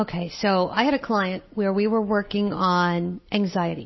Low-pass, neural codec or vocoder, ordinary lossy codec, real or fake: 7.2 kHz; none; MP3, 24 kbps; real